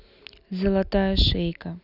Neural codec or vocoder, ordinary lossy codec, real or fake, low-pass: none; none; real; 5.4 kHz